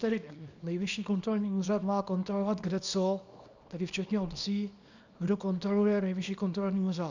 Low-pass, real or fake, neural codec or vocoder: 7.2 kHz; fake; codec, 24 kHz, 0.9 kbps, WavTokenizer, small release